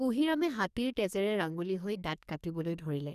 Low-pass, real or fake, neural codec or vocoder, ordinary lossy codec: 14.4 kHz; fake; codec, 44.1 kHz, 2.6 kbps, SNAC; none